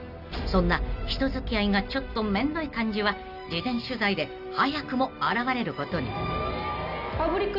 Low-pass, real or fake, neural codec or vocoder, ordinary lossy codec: 5.4 kHz; real; none; none